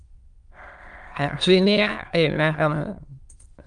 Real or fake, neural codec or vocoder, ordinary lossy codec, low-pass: fake; autoencoder, 22.05 kHz, a latent of 192 numbers a frame, VITS, trained on many speakers; Opus, 32 kbps; 9.9 kHz